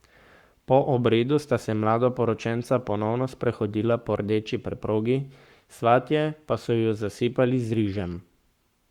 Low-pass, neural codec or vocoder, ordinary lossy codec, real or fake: 19.8 kHz; codec, 44.1 kHz, 7.8 kbps, Pupu-Codec; Opus, 64 kbps; fake